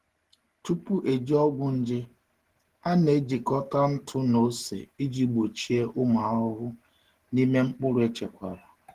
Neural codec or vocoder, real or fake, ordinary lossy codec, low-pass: none; real; Opus, 16 kbps; 14.4 kHz